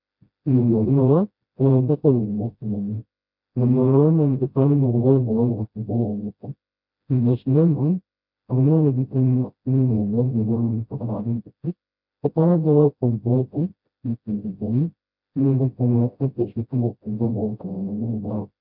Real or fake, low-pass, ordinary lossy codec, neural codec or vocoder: fake; 5.4 kHz; MP3, 48 kbps; codec, 16 kHz, 0.5 kbps, FreqCodec, smaller model